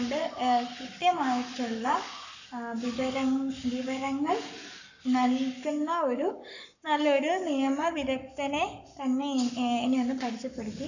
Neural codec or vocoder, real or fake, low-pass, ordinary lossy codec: codec, 44.1 kHz, 7.8 kbps, Pupu-Codec; fake; 7.2 kHz; none